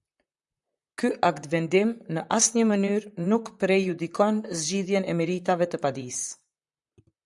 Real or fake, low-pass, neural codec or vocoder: fake; 10.8 kHz; vocoder, 44.1 kHz, 128 mel bands, Pupu-Vocoder